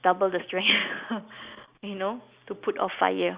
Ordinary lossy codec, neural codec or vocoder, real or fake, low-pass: Opus, 64 kbps; none; real; 3.6 kHz